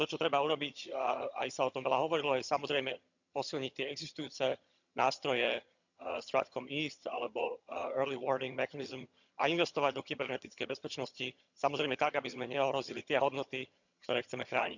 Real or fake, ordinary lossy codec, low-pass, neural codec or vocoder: fake; none; 7.2 kHz; vocoder, 22.05 kHz, 80 mel bands, HiFi-GAN